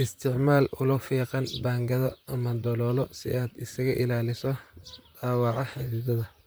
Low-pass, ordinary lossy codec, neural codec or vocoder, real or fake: none; none; vocoder, 44.1 kHz, 128 mel bands, Pupu-Vocoder; fake